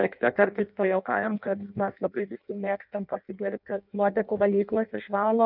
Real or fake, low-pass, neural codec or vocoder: fake; 5.4 kHz; codec, 16 kHz in and 24 kHz out, 0.6 kbps, FireRedTTS-2 codec